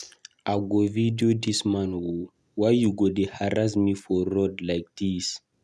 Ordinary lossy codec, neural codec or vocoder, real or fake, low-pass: none; none; real; none